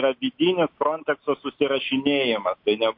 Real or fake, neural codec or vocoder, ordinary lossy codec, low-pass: real; none; MP3, 32 kbps; 5.4 kHz